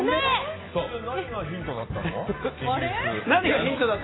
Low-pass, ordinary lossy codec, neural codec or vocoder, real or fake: 7.2 kHz; AAC, 16 kbps; none; real